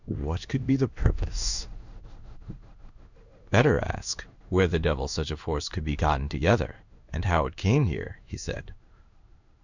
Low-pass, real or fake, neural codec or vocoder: 7.2 kHz; fake; codec, 16 kHz in and 24 kHz out, 1 kbps, XY-Tokenizer